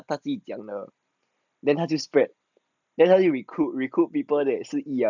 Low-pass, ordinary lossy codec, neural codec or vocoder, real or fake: 7.2 kHz; none; none; real